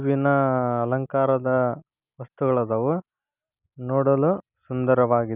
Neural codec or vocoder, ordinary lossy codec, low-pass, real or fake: none; none; 3.6 kHz; real